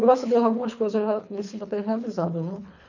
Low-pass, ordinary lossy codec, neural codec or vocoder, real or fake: 7.2 kHz; none; codec, 24 kHz, 3 kbps, HILCodec; fake